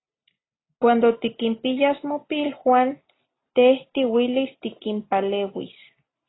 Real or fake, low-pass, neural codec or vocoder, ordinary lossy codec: real; 7.2 kHz; none; AAC, 16 kbps